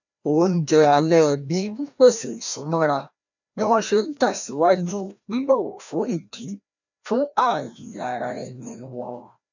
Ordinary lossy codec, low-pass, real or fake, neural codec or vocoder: none; 7.2 kHz; fake; codec, 16 kHz, 1 kbps, FreqCodec, larger model